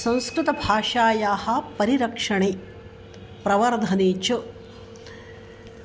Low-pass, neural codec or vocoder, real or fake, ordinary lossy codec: none; none; real; none